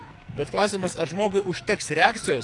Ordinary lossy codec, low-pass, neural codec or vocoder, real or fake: AAC, 48 kbps; 10.8 kHz; codec, 44.1 kHz, 2.6 kbps, SNAC; fake